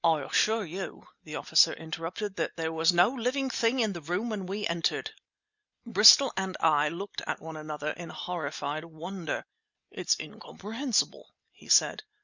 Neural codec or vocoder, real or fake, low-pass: none; real; 7.2 kHz